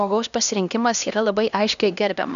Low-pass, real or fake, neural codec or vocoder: 7.2 kHz; fake; codec, 16 kHz, 1 kbps, X-Codec, HuBERT features, trained on LibriSpeech